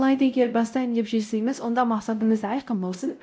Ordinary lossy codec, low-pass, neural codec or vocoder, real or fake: none; none; codec, 16 kHz, 0.5 kbps, X-Codec, WavLM features, trained on Multilingual LibriSpeech; fake